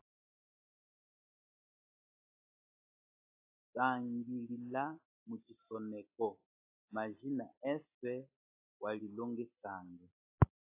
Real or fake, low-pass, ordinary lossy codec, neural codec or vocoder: real; 3.6 kHz; AAC, 32 kbps; none